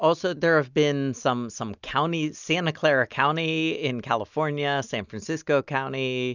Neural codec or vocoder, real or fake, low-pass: none; real; 7.2 kHz